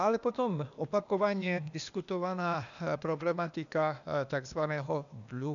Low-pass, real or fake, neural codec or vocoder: 7.2 kHz; fake; codec, 16 kHz, 0.8 kbps, ZipCodec